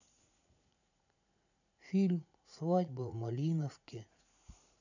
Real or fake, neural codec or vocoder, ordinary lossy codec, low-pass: fake; vocoder, 44.1 kHz, 80 mel bands, Vocos; none; 7.2 kHz